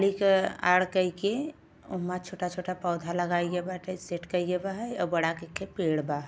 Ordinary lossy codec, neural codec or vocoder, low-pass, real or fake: none; none; none; real